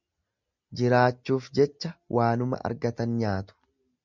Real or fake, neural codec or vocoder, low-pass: real; none; 7.2 kHz